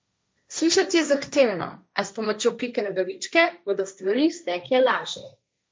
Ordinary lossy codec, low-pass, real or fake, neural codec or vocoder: none; none; fake; codec, 16 kHz, 1.1 kbps, Voila-Tokenizer